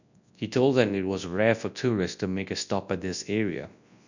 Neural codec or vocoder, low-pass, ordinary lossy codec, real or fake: codec, 24 kHz, 0.9 kbps, WavTokenizer, large speech release; 7.2 kHz; none; fake